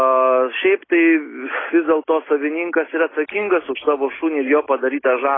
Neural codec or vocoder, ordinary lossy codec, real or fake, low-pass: none; AAC, 16 kbps; real; 7.2 kHz